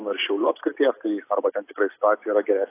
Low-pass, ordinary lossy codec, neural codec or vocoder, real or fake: 3.6 kHz; AAC, 24 kbps; none; real